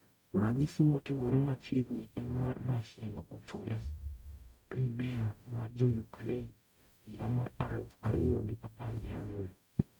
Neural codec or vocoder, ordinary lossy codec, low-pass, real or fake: codec, 44.1 kHz, 0.9 kbps, DAC; none; 19.8 kHz; fake